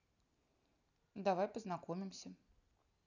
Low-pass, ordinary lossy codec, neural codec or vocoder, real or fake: 7.2 kHz; none; none; real